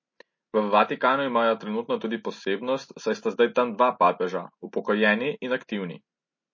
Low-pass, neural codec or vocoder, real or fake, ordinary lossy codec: 7.2 kHz; none; real; MP3, 32 kbps